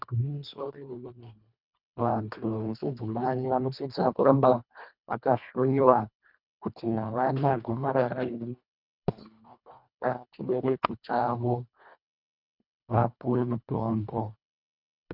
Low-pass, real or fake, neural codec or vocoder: 5.4 kHz; fake; codec, 24 kHz, 1.5 kbps, HILCodec